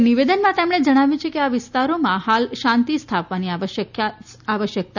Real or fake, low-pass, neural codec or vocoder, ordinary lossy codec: real; 7.2 kHz; none; none